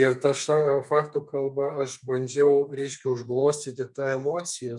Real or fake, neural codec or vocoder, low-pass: fake; codec, 32 kHz, 1.9 kbps, SNAC; 10.8 kHz